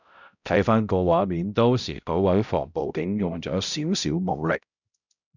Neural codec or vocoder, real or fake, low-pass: codec, 16 kHz, 0.5 kbps, X-Codec, HuBERT features, trained on balanced general audio; fake; 7.2 kHz